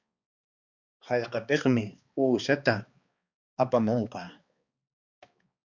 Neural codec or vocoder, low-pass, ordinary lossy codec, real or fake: codec, 16 kHz, 2 kbps, X-Codec, HuBERT features, trained on balanced general audio; 7.2 kHz; Opus, 64 kbps; fake